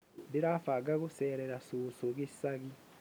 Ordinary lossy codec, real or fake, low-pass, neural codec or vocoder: none; real; none; none